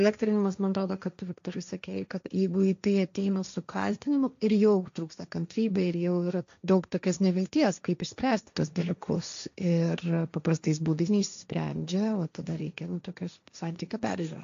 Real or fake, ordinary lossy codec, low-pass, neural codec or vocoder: fake; AAC, 64 kbps; 7.2 kHz; codec, 16 kHz, 1.1 kbps, Voila-Tokenizer